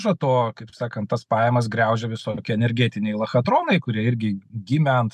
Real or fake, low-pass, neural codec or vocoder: real; 14.4 kHz; none